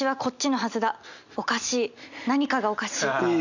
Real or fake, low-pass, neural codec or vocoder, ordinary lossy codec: real; 7.2 kHz; none; none